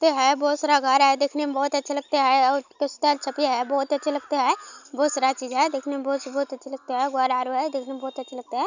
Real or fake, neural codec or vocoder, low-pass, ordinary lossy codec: real; none; 7.2 kHz; none